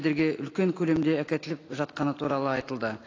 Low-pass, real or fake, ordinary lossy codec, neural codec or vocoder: 7.2 kHz; real; AAC, 32 kbps; none